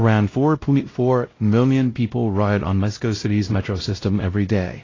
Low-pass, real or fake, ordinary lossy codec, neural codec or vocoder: 7.2 kHz; fake; AAC, 32 kbps; codec, 16 kHz, 0.5 kbps, X-Codec, WavLM features, trained on Multilingual LibriSpeech